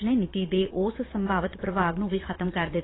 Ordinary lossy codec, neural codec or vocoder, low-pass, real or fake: AAC, 16 kbps; vocoder, 22.05 kHz, 80 mel bands, Vocos; 7.2 kHz; fake